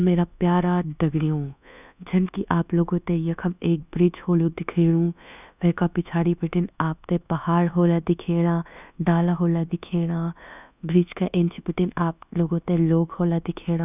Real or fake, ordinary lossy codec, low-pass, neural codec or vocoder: fake; none; 3.6 kHz; codec, 24 kHz, 1.2 kbps, DualCodec